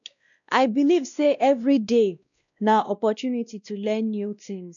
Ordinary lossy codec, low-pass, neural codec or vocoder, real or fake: none; 7.2 kHz; codec, 16 kHz, 1 kbps, X-Codec, WavLM features, trained on Multilingual LibriSpeech; fake